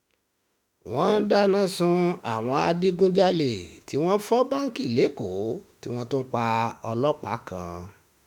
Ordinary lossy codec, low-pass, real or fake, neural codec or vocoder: none; 19.8 kHz; fake; autoencoder, 48 kHz, 32 numbers a frame, DAC-VAE, trained on Japanese speech